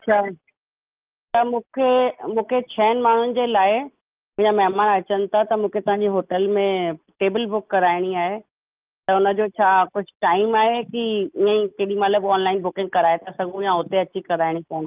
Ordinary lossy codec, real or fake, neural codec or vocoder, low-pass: Opus, 24 kbps; real; none; 3.6 kHz